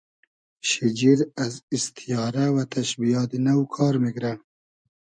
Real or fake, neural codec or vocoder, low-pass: real; none; 9.9 kHz